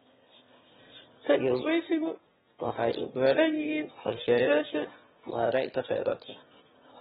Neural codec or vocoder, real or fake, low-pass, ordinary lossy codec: autoencoder, 22.05 kHz, a latent of 192 numbers a frame, VITS, trained on one speaker; fake; 9.9 kHz; AAC, 16 kbps